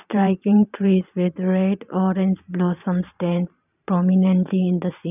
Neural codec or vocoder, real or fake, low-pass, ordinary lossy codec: vocoder, 44.1 kHz, 128 mel bands every 512 samples, BigVGAN v2; fake; 3.6 kHz; none